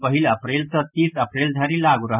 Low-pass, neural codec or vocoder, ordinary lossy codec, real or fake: 3.6 kHz; none; none; real